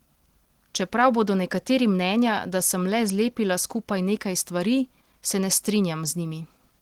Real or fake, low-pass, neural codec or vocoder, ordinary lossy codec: real; 19.8 kHz; none; Opus, 16 kbps